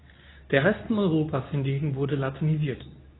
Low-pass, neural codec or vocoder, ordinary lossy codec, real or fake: 7.2 kHz; codec, 24 kHz, 0.9 kbps, WavTokenizer, medium speech release version 2; AAC, 16 kbps; fake